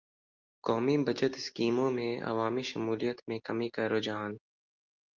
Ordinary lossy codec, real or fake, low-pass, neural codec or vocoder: Opus, 24 kbps; real; 7.2 kHz; none